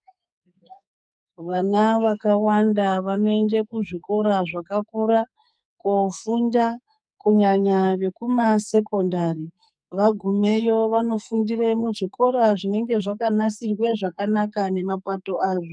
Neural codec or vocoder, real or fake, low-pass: codec, 44.1 kHz, 2.6 kbps, SNAC; fake; 9.9 kHz